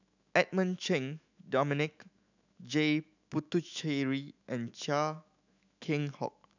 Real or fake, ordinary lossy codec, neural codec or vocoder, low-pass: fake; none; autoencoder, 48 kHz, 128 numbers a frame, DAC-VAE, trained on Japanese speech; 7.2 kHz